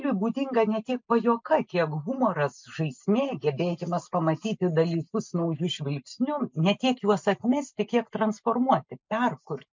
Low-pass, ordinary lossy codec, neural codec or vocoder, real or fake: 7.2 kHz; MP3, 64 kbps; none; real